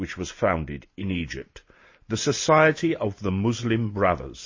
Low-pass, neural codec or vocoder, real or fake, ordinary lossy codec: 7.2 kHz; none; real; MP3, 32 kbps